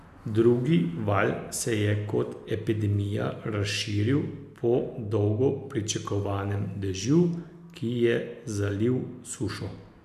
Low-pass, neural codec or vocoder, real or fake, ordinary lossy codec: 14.4 kHz; none; real; none